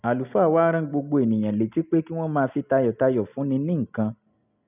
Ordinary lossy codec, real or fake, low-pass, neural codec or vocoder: none; real; 3.6 kHz; none